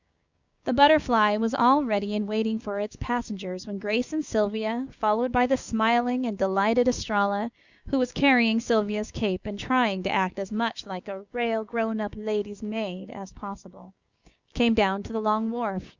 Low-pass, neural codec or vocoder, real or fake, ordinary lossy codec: 7.2 kHz; codec, 16 kHz, 6 kbps, DAC; fake; Opus, 64 kbps